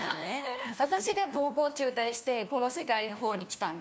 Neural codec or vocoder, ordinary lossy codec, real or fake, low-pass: codec, 16 kHz, 1 kbps, FunCodec, trained on LibriTTS, 50 frames a second; none; fake; none